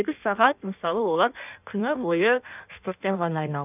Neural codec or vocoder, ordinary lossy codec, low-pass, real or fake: codec, 16 kHz in and 24 kHz out, 1.1 kbps, FireRedTTS-2 codec; none; 3.6 kHz; fake